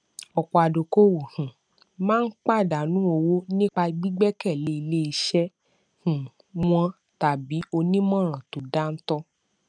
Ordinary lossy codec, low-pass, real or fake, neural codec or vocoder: none; 9.9 kHz; real; none